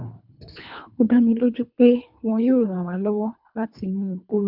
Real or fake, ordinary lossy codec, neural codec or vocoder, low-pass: fake; none; codec, 24 kHz, 3 kbps, HILCodec; 5.4 kHz